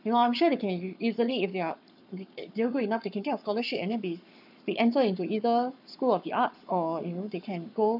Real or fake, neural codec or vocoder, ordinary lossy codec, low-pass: fake; codec, 44.1 kHz, 7.8 kbps, Pupu-Codec; none; 5.4 kHz